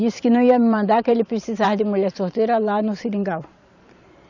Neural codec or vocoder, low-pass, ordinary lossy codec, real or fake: vocoder, 44.1 kHz, 128 mel bands every 512 samples, BigVGAN v2; 7.2 kHz; none; fake